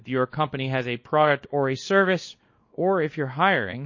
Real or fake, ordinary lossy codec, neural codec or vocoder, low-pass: fake; MP3, 32 kbps; codec, 24 kHz, 0.9 kbps, WavTokenizer, small release; 7.2 kHz